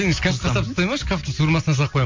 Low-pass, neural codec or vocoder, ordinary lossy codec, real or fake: 7.2 kHz; none; MP3, 48 kbps; real